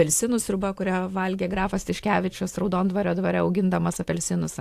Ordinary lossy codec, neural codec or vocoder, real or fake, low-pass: AAC, 64 kbps; vocoder, 44.1 kHz, 128 mel bands every 512 samples, BigVGAN v2; fake; 14.4 kHz